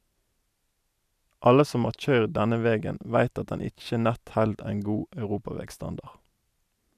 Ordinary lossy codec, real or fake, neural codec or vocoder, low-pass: none; real; none; 14.4 kHz